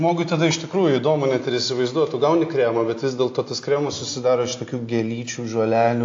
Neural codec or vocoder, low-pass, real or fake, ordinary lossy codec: none; 7.2 kHz; real; AAC, 48 kbps